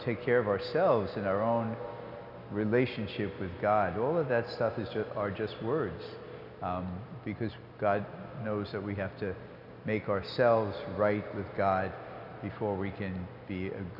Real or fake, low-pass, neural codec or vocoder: real; 5.4 kHz; none